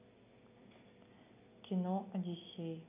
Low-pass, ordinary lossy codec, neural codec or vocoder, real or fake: 3.6 kHz; none; none; real